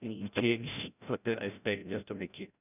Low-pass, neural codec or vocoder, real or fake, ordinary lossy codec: 3.6 kHz; codec, 16 kHz, 0.5 kbps, FreqCodec, larger model; fake; none